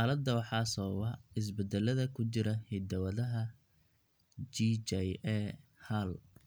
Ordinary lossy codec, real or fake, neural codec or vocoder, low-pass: none; real; none; none